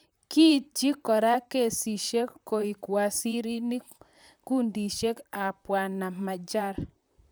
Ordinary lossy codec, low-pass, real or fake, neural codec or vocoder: none; none; fake; vocoder, 44.1 kHz, 128 mel bands, Pupu-Vocoder